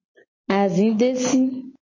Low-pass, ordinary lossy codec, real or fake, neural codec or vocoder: 7.2 kHz; MP3, 32 kbps; real; none